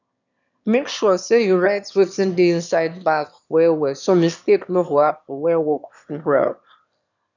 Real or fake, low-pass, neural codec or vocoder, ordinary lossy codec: fake; 7.2 kHz; autoencoder, 22.05 kHz, a latent of 192 numbers a frame, VITS, trained on one speaker; none